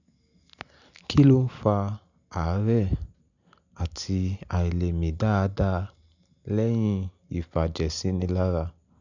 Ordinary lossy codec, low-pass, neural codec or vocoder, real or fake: none; 7.2 kHz; none; real